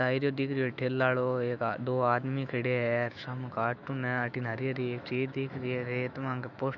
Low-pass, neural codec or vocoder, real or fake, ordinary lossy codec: 7.2 kHz; autoencoder, 48 kHz, 128 numbers a frame, DAC-VAE, trained on Japanese speech; fake; none